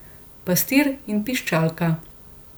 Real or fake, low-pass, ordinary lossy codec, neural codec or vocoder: real; none; none; none